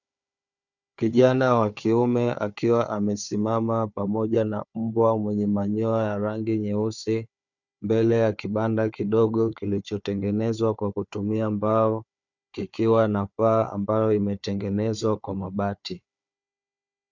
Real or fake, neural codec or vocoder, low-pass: fake; codec, 16 kHz, 4 kbps, FunCodec, trained on Chinese and English, 50 frames a second; 7.2 kHz